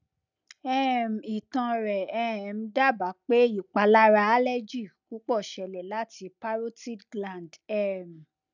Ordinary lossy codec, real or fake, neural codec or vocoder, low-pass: none; real; none; 7.2 kHz